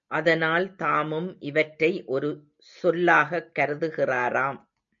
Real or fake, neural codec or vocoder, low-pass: real; none; 7.2 kHz